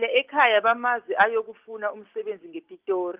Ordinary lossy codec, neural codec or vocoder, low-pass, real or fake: Opus, 32 kbps; none; 3.6 kHz; real